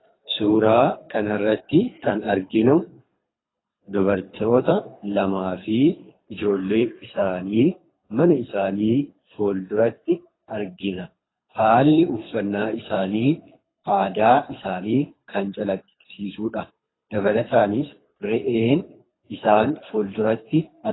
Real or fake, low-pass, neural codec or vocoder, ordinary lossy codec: fake; 7.2 kHz; codec, 24 kHz, 3 kbps, HILCodec; AAC, 16 kbps